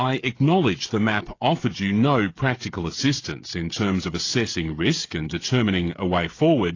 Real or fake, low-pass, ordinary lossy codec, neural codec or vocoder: fake; 7.2 kHz; AAC, 32 kbps; codec, 16 kHz, 16 kbps, FreqCodec, smaller model